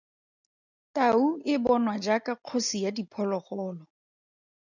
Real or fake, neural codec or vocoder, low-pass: real; none; 7.2 kHz